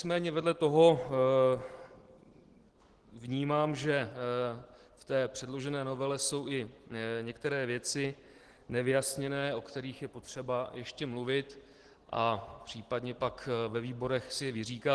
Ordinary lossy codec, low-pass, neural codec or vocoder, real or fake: Opus, 16 kbps; 10.8 kHz; none; real